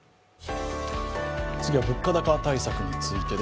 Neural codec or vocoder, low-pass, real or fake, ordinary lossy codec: none; none; real; none